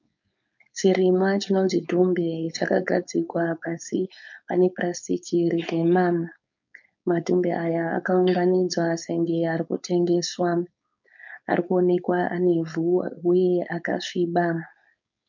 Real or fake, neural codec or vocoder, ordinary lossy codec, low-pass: fake; codec, 16 kHz, 4.8 kbps, FACodec; MP3, 64 kbps; 7.2 kHz